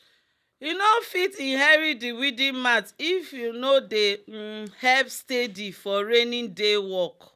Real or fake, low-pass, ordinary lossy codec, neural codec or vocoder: real; 14.4 kHz; none; none